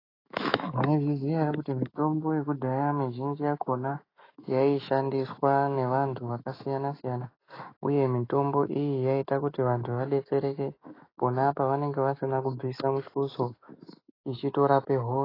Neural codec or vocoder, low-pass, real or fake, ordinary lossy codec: autoencoder, 48 kHz, 128 numbers a frame, DAC-VAE, trained on Japanese speech; 5.4 kHz; fake; AAC, 24 kbps